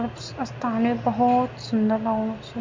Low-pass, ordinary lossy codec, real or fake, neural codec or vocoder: 7.2 kHz; MP3, 64 kbps; real; none